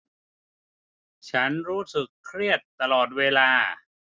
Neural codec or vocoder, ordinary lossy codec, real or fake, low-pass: none; none; real; none